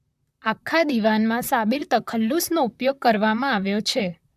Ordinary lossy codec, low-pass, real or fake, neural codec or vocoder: AAC, 96 kbps; 14.4 kHz; fake; vocoder, 44.1 kHz, 128 mel bands, Pupu-Vocoder